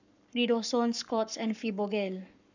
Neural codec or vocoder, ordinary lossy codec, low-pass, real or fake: codec, 44.1 kHz, 7.8 kbps, Pupu-Codec; none; 7.2 kHz; fake